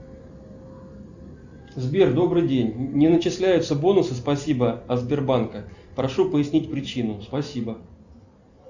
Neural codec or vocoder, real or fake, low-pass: none; real; 7.2 kHz